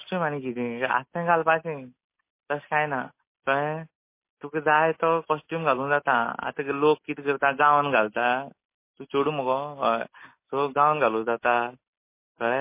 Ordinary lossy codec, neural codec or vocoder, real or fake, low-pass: MP3, 24 kbps; none; real; 3.6 kHz